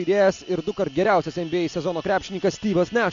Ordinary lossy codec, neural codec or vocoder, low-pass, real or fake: MP3, 64 kbps; none; 7.2 kHz; real